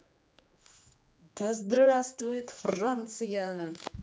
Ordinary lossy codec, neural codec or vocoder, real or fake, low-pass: none; codec, 16 kHz, 2 kbps, X-Codec, HuBERT features, trained on general audio; fake; none